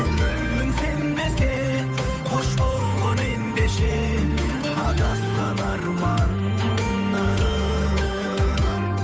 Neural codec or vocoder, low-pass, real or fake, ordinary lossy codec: codec, 16 kHz, 8 kbps, FunCodec, trained on Chinese and English, 25 frames a second; none; fake; none